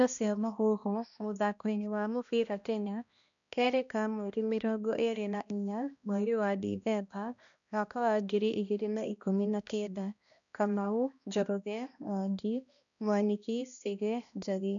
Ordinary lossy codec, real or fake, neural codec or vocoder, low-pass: none; fake; codec, 16 kHz, 1 kbps, X-Codec, HuBERT features, trained on balanced general audio; 7.2 kHz